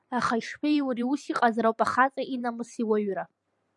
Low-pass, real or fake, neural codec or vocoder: 10.8 kHz; fake; vocoder, 44.1 kHz, 128 mel bands every 512 samples, BigVGAN v2